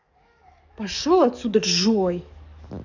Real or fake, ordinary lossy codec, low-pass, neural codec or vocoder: fake; none; 7.2 kHz; codec, 44.1 kHz, 7.8 kbps, DAC